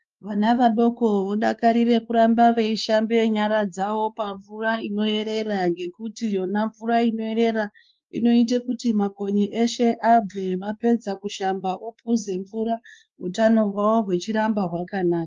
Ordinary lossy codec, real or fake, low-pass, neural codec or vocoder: Opus, 32 kbps; fake; 7.2 kHz; codec, 16 kHz, 2 kbps, X-Codec, WavLM features, trained on Multilingual LibriSpeech